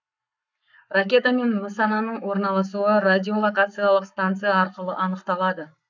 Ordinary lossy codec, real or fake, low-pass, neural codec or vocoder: none; fake; 7.2 kHz; codec, 44.1 kHz, 7.8 kbps, Pupu-Codec